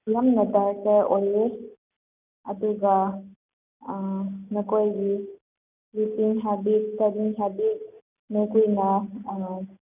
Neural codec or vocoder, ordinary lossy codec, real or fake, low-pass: none; none; real; 3.6 kHz